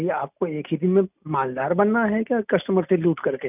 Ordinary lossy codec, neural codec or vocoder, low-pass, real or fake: none; none; 3.6 kHz; real